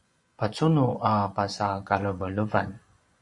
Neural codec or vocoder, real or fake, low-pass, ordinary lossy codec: vocoder, 24 kHz, 100 mel bands, Vocos; fake; 10.8 kHz; MP3, 64 kbps